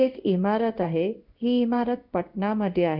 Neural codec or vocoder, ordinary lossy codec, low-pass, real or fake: codec, 16 kHz in and 24 kHz out, 1 kbps, XY-Tokenizer; none; 5.4 kHz; fake